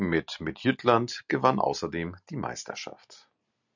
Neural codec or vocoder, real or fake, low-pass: none; real; 7.2 kHz